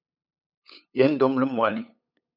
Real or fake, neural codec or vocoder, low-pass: fake; codec, 16 kHz, 8 kbps, FunCodec, trained on LibriTTS, 25 frames a second; 5.4 kHz